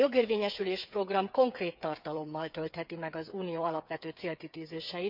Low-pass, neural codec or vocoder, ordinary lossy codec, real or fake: 5.4 kHz; codec, 16 kHz, 8 kbps, FreqCodec, smaller model; none; fake